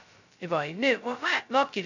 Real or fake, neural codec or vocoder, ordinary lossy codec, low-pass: fake; codec, 16 kHz, 0.2 kbps, FocalCodec; none; 7.2 kHz